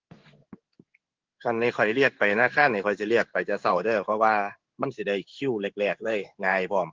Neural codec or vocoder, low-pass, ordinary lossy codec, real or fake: codec, 16 kHz in and 24 kHz out, 1 kbps, XY-Tokenizer; 7.2 kHz; Opus, 16 kbps; fake